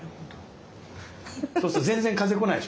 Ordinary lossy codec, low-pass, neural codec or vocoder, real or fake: none; none; none; real